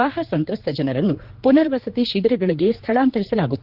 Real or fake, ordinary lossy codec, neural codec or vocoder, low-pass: fake; Opus, 16 kbps; codec, 16 kHz, 4 kbps, X-Codec, HuBERT features, trained on general audio; 5.4 kHz